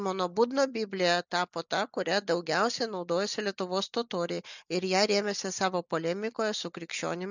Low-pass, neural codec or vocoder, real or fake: 7.2 kHz; none; real